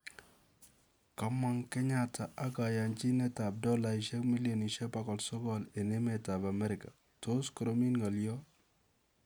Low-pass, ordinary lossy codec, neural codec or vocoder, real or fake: none; none; none; real